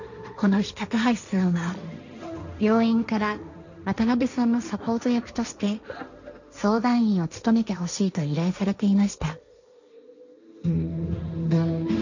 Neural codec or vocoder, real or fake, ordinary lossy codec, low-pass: codec, 16 kHz, 1.1 kbps, Voila-Tokenizer; fake; none; 7.2 kHz